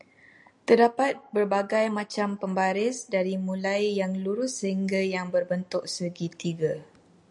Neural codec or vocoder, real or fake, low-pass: none; real; 10.8 kHz